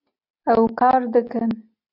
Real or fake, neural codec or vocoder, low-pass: real; none; 5.4 kHz